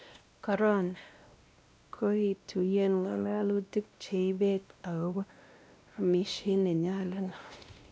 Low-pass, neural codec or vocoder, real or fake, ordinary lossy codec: none; codec, 16 kHz, 1 kbps, X-Codec, WavLM features, trained on Multilingual LibriSpeech; fake; none